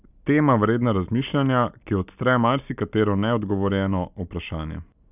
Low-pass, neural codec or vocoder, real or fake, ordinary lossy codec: 3.6 kHz; none; real; none